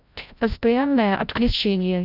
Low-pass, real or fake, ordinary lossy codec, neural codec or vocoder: 5.4 kHz; fake; none; codec, 16 kHz, 0.5 kbps, FreqCodec, larger model